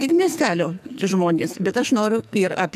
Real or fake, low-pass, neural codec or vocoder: fake; 14.4 kHz; codec, 44.1 kHz, 2.6 kbps, SNAC